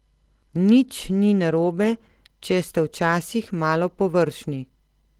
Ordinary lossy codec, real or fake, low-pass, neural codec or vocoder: Opus, 16 kbps; real; 19.8 kHz; none